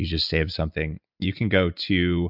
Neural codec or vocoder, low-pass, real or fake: none; 5.4 kHz; real